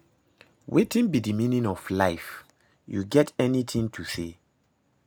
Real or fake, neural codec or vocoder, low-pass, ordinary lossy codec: real; none; none; none